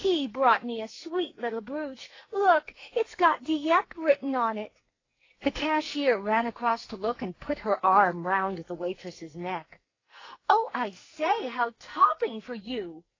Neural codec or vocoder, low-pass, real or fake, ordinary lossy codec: codec, 44.1 kHz, 2.6 kbps, SNAC; 7.2 kHz; fake; AAC, 32 kbps